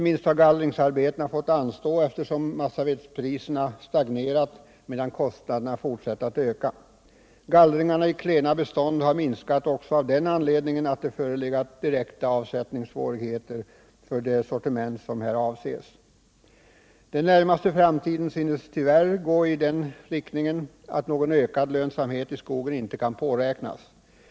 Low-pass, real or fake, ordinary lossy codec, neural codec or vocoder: none; real; none; none